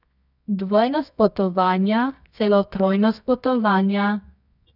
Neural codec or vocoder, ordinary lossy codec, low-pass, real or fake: codec, 24 kHz, 0.9 kbps, WavTokenizer, medium music audio release; none; 5.4 kHz; fake